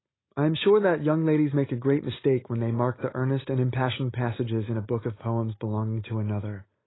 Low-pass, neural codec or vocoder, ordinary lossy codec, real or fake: 7.2 kHz; none; AAC, 16 kbps; real